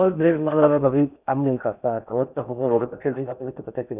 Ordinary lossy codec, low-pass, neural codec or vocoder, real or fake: none; 3.6 kHz; codec, 16 kHz in and 24 kHz out, 0.6 kbps, FocalCodec, streaming, 4096 codes; fake